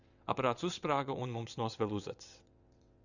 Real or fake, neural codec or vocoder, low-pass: fake; vocoder, 22.05 kHz, 80 mel bands, WaveNeXt; 7.2 kHz